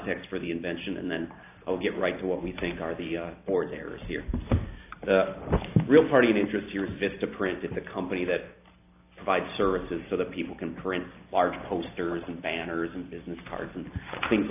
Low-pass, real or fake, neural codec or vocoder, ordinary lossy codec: 3.6 kHz; real; none; AAC, 32 kbps